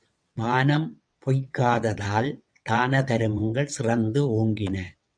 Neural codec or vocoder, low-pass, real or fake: vocoder, 22.05 kHz, 80 mel bands, WaveNeXt; 9.9 kHz; fake